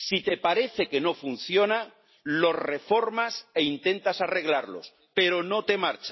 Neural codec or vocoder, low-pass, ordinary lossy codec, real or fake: none; 7.2 kHz; MP3, 24 kbps; real